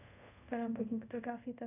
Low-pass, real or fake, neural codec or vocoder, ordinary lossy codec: 3.6 kHz; fake; codec, 24 kHz, 0.5 kbps, DualCodec; none